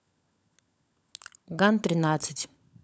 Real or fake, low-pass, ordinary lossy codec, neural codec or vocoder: fake; none; none; codec, 16 kHz, 16 kbps, FunCodec, trained on LibriTTS, 50 frames a second